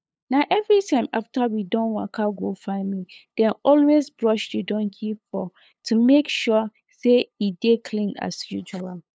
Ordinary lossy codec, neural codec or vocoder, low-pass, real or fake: none; codec, 16 kHz, 8 kbps, FunCodec, trained on LibriTTS, 25 frames a second; none; fake